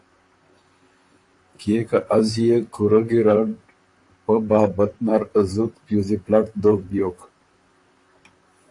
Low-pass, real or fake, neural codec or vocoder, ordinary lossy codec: 10.8 kHz; fake; vocoder, 44.1 kHz, 128 mel bands, Pupu-Vocoder; AAC, 48 kbps